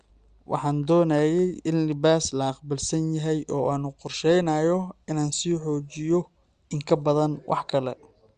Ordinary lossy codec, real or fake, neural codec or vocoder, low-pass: Opus, 32 kbps; real; none; 9.9 kHz